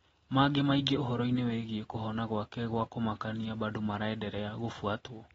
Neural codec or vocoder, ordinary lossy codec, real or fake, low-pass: none; AAC, 24 kbps; real; 10.8 kHz